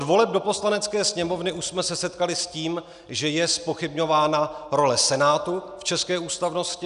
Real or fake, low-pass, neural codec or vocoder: real; 10.8 kHz; none